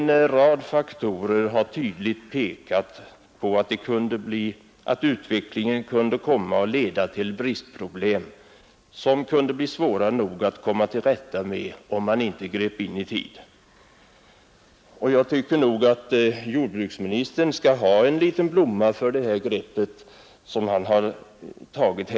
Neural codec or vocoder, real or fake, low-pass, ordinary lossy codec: none; real; none; none